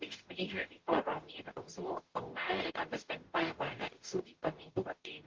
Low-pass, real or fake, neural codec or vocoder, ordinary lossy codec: 7.2 kHz; fake; codec, 44.1 kHz, 0.9 kbps, DAC; Opus, 16 kbps